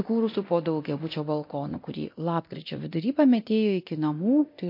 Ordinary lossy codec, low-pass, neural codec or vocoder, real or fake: MP3, 32 kbps; 5.4 kHz; codec, 24 kHz, 0.9 kbps, DualCodec; fake